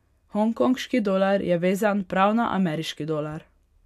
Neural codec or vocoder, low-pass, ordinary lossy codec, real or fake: none; 14.4 kHz; MP3, 96 kbps; real